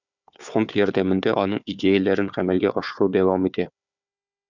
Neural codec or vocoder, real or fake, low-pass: codec, 16 kHz, 4 kbps, FunCodec, trained on Chinese and English, 50 frames a second; fake; 7.2 kHz